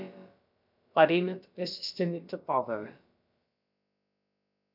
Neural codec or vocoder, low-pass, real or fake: codec, 16 kHz, about 1 kbps, DyCAST, with the encoder's durations; 5.4 kHz; fake